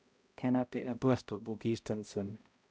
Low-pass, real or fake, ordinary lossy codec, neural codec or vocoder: none; fake; none; codec, 16 kHz, 0.5 kbps, X-Codec, HuBERT features, trained on balanced general audio